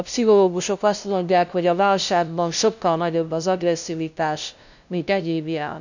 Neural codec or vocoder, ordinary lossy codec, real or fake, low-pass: codec, 16 kHz, 0.5 kbps, FunCodec, trained on LibriTTS, 25 frames a second; none; fake; 7.2 kHz